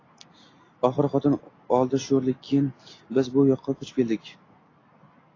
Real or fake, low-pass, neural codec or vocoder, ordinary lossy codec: real; 7.2 kHz; none; AAC, 32 kbps